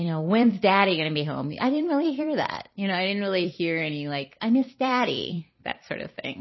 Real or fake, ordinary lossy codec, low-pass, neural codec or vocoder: fake; MP3, 24 kbps; 7.2 kHz; vocoder, 44.1 kHz, 128 mel bands every 256 samples, BigVGAN v2